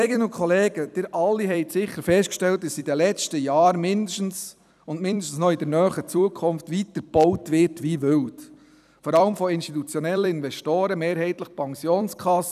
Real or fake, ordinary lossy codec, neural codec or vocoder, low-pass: fake; none; vocoder, 44.1 kHz, 128 mel bands every 256 samples, BigVGAN v2; 14.4 kHz